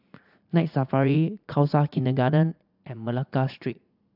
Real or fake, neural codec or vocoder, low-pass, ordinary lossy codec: fake; vocoder, 44.1 kHz, 80 mel bands, Vocos; 5.4 kHz; AAC, 48 kbps